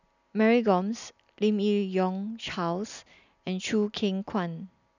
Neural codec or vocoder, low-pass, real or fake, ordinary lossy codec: none; 7.2 kHz; real; none